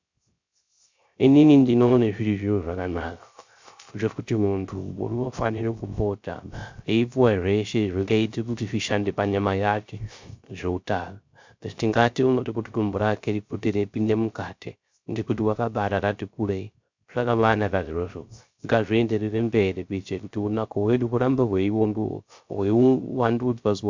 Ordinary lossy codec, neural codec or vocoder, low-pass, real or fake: MP3, 64 kbps; codec, 16 kHz, 0.3 kbps, FocalCodec; 7.2 kHz; fake